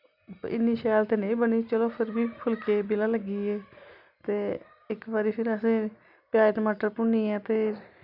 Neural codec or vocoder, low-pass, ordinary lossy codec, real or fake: none; 5.4 kHz; none; real